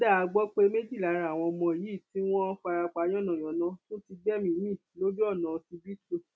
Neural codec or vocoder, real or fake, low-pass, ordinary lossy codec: none; real; none; none